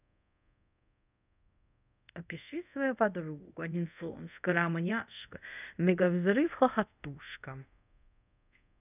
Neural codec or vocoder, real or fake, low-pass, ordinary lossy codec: codec, 24 kHz, 0.5 kbps, DualCodec; fake; 3.6 kHz; none